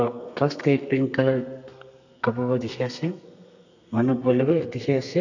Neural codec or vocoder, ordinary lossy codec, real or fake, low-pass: codec, 32 kHz, 1.9 kbps, SNAC; none; fake; 7.2 kHz